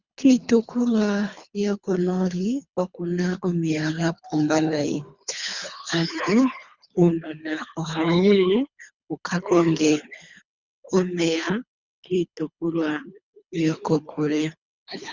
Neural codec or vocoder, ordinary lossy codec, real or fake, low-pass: codec, 24 kHz, 3 kbps, HILCodec; Opus, 64 kbps; fake; 7.2 kHz